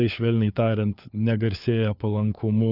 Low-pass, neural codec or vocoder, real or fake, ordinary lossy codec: 5.4 kHz; codec, 16 kHz, 4 kbps, FunCodec, trained on LibriTTS, 50 frames a second; fake; Opus, 64 kbps